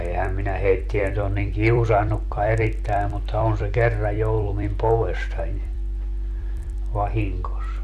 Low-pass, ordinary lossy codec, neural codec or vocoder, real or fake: 14.4 kHz; none; vocoder, 44.1 kHz, 128 mel bands every 256 samples, BigVGAN v2; fake